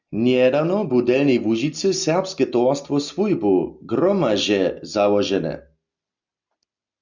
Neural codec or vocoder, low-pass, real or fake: none; 7.2 kHz; real